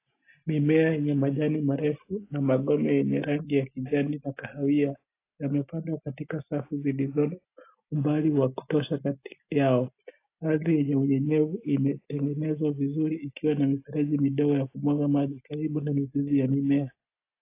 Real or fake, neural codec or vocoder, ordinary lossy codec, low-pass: real; none; AAC, 24 kbps; 3.6 kHz